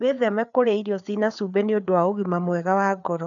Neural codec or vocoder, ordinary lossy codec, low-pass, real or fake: codec, 16 kHz, 4 kbps, FreqCodec, larger model; none; 7.2 kHz; fake